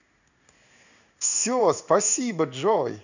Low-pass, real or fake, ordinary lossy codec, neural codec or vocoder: 7.2 kHz; fake; none; codec, 16 kHz in and 24 kHz out, 1 kbps, XY-Tokenizer